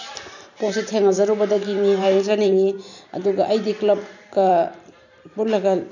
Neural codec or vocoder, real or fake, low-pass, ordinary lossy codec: vocoder, 44.1 kHz, 128 mel bands every 512 samples, BigVGAN v2; fake; 7.2 kHz; none